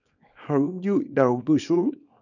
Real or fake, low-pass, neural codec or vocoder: fake; 7.2 kHz; codec, 24 kHz, 0.9 kbps, WavTokenizer, small release